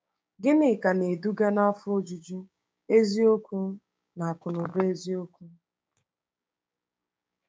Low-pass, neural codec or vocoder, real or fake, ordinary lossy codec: none; codec, 16 kHz, 6 kbps, DAC; fake; none